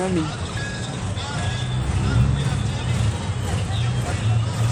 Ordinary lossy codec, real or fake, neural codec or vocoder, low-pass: none; real; none; 19.8 kHz